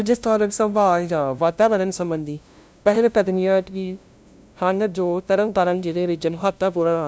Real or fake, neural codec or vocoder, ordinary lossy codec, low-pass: fake; codec, 16 kHz, 0.5 kbps, FunCodec, trained on LibriTTS, 25 frames a second; none; none